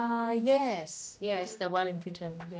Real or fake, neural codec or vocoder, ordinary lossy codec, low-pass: fake; codec, 16 kHz, 1 kbps, X-Codec, HuBERT features, trained on general audio; none; none